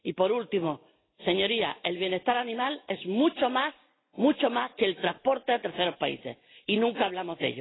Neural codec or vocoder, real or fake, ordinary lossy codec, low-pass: none; real; AAC, 16 kbps; 7.2 kHz